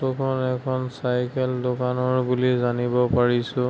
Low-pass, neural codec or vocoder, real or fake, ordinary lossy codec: none; none; real; none